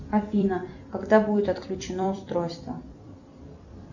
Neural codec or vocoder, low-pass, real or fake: vocoder, 44.1 kHz, 128 mel bands every 256 samples, BigVGAN v2; 7.2 kHz; fake